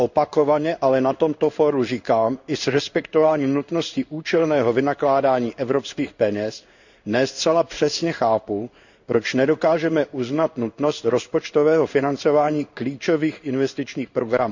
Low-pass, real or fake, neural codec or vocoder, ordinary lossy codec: 7.2 kHz; fake; codec, 16 kHz in and 24 kHz out, 1 kbps, XY-Tokenizer; none